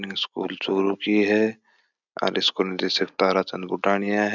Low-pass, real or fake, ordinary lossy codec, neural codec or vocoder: 7.2 kHz; real; none; none